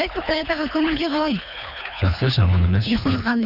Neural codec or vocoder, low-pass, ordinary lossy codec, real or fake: codec, 24 kHz, 3 kbps, HILCodec; 5.4 kHz; none; fake